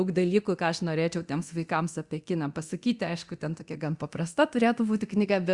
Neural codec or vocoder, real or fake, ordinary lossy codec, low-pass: codec, 24 kHz, 0.9 kbps, DualCodec; fake; Opus, 64 kbps; 10.8 kHz